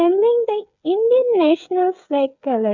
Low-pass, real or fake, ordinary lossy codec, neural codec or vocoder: 7.2 kHz; fake; MP3, 64 kbps; codec, 16 kHz, 8 kbps, FreqCodec, smaller model